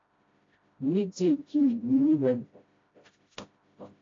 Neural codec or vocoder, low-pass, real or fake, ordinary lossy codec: codec, 16 kHz, 0.5 kbps, FreqCodec, smaller model; 7.2 kHz; fake; AAC, 32 kbps